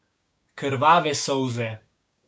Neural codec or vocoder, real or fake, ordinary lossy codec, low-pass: codec, 16 kHz, 6 kbps, DAC; fake; none; none